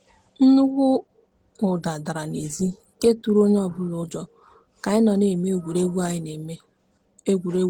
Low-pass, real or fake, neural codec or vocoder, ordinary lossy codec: 19.8 kHz; real; none; Opus, 16 kbps